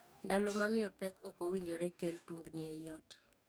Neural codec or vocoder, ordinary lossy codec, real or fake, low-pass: codec, 44.1 kHz, 2.6 kbps, DAC; none; fake; none